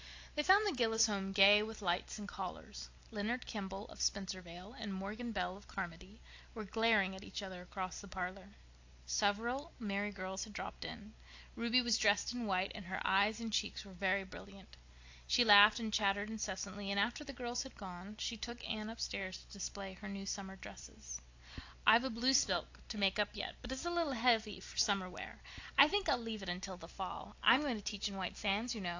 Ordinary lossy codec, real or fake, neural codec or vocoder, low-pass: AAC, 48 kbps; real; none; 7.2 kHz